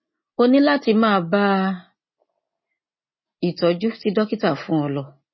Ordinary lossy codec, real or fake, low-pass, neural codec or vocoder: MP3, 24 kbps; real; 7.2 kHz; none